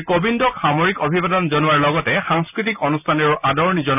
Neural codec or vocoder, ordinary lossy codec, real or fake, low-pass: none; none; real; 3.6 kHz